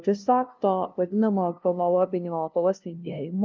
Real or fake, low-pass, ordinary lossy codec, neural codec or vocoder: fake; 7.2 kHz; Opus, 24 kbps; codec, 16 kHz, 0.5 kbps, FunCodec, trained on LibriTTS, 25 frames a second